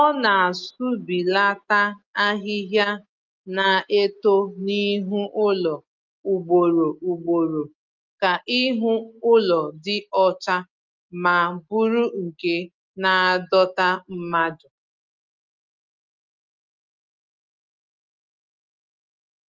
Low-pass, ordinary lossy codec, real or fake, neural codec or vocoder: 7.2 kHz; Opus, 24 kbps; real; none